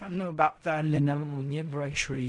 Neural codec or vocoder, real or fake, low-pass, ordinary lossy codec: codec, 16 kHz in and 24 kHz out, 0.4 kbps, LongCat-Audio-Codec, fine tuned four codebook decoder; fake; 10.8 kHz; MP3, 64 kbps